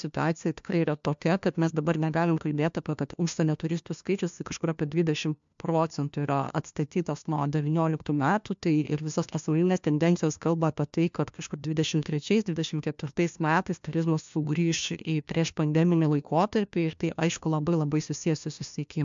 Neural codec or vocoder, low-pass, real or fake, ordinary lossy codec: codec, 16 kHz, 1 kbps, FunCodec, trained on LibriTTS, 50 frames a second; 7.2 kHz; fake; MP3, 64 kbps